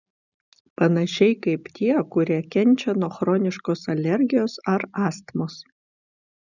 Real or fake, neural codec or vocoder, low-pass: real; none; 7.2 kHz